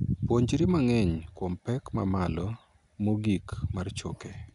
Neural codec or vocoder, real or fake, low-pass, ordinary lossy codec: none; real; 10.8 kHz; none